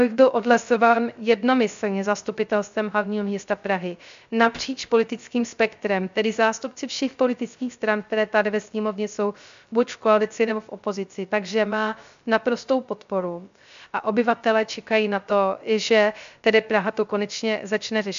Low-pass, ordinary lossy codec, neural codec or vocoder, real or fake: 7.2 kHz; MP3, 64 kbps; codec, 16 kHz, 0.3 kbps, FocalCodec; fake